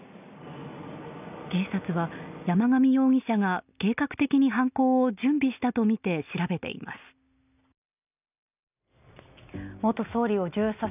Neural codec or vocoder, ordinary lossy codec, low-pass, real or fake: none; none; 3.6 kHz; real